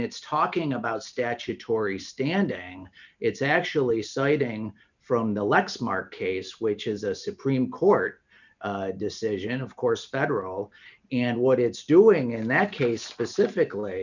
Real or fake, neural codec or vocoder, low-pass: real; none; 7.2 kHz